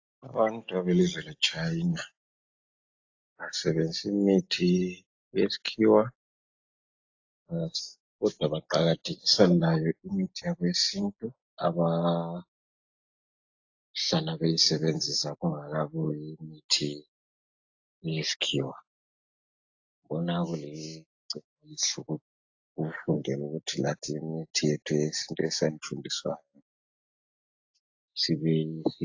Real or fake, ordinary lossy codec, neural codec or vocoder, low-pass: real; AAC, 48 kbps; none; 7.2 kHz